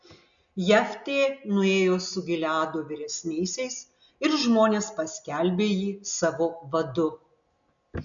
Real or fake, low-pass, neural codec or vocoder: real; 7.2 kHz; none